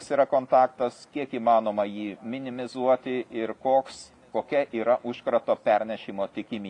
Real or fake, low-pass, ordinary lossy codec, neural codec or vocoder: real; 10.8 kHz; AAC, 48 kbps; none